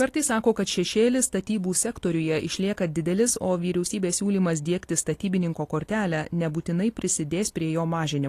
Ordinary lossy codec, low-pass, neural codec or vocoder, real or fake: AAC, 48 kbps; 14.4 kHz; none; real